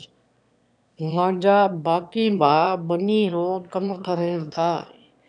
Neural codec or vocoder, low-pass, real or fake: autoencoder, 22.05 kHz, a latent of 192 numbers a frame, VITS, trained on one speaker; 9.9 kHz; fake